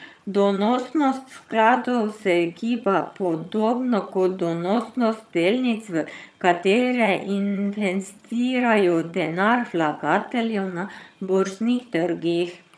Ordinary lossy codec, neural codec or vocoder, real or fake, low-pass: none; vocoder, 22.05 kHz, 80 mel bands, HiFi-GAN; fake; none